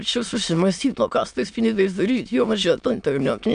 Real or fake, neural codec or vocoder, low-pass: fake; autoencoder, 22.05 kHz, a latent of 192 numbers a frame, VITS, trained on many speakers; 9.9 kHz